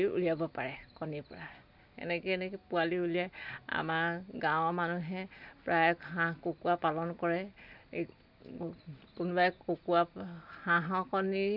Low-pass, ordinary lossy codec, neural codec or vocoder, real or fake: 5.4 kHz; none; none; real